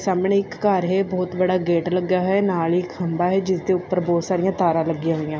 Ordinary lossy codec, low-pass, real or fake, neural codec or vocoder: none; none; real; none